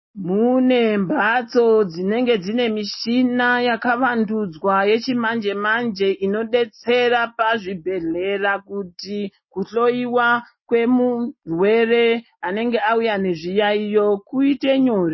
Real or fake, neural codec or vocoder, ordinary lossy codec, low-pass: real; none; MP3, 24 kbps; 7.2 kHz